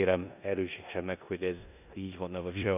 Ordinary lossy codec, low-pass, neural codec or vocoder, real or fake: none; 3.6 kHz; codec, 16 kHz in and 24 kHz out, 0.9 kbps, LongCat-Audio-Codec, four codebook decoder; fake